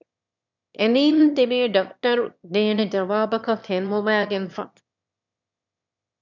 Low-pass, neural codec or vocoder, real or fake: 7.2 kHz; autoencoder, 22.05 kHz, a latent of 192 numbers a frame, VITS, trained on one speaker; fake